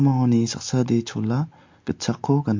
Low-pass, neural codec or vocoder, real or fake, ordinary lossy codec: 7.2 kHz; none; real; MP3, 48 kbps